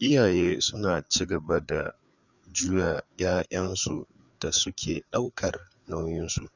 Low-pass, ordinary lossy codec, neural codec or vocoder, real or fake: 7.2 kHz; none; codec, 16 kHz, 4 kbps, FreqCodec, larger model; fake